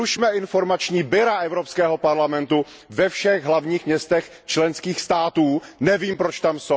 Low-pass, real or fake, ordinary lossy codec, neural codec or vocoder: none; real; none; none